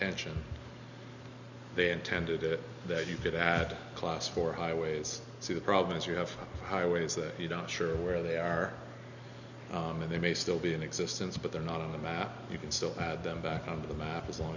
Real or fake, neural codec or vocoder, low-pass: real; none; 7.2 kHz